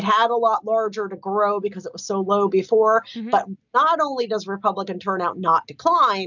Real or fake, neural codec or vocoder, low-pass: real; none; 7.2 kHz